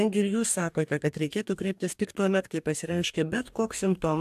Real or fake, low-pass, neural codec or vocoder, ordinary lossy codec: fake; 14.4 kHz; codec, 44.1 kHz, 2.6 kbps, DAC; AAC, 96 kbps